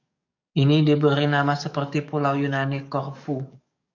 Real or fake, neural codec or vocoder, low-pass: fake; codec, 44.1 kHz, 7.8 kbps, DAC; 7.2 kHz